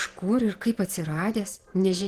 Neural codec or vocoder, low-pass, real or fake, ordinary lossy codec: vocoder, 48 kHz, 128 mel bands, Vocos; 14.4 kHz; fake; Opus, 16 kbps